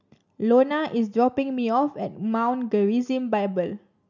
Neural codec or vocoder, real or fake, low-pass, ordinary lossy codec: none; real; 7.2 kHz; none